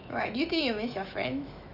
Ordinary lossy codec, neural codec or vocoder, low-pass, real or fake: none; autoencoder, 48 kHz, 128 numbers a frame, DAC-VAE, trained on Japanese speech; 5.4 kHz; fake